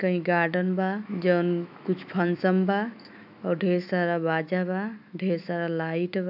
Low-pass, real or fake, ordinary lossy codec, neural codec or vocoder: 5.4 kHz; fake; AAC, 48 kbps; autoencoder, 48 kHz, 128 numbers a frame, DAC-VAE, trained on Japanese speech